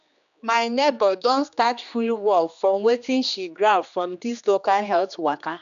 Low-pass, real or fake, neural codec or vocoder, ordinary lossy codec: 7.2 kHz; fake; codec, 16 kHz, 2 kbps, X-Codec, HuBERT features, trained on general audio; none